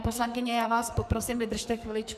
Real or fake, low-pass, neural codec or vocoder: fake; 14.4 kHz; codec, 44.1 kHz, 2.6 kbps, SNAC